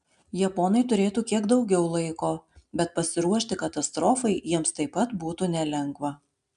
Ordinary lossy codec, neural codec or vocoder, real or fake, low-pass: MP3, 96 kbps; none; real; 10.8 kHz